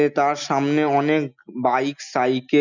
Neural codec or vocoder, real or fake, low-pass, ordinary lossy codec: none; real; 7.2 kHz; none